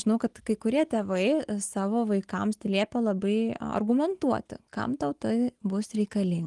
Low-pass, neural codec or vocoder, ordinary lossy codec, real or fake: 10.8 kHz; none; Opus, 24 kbps; real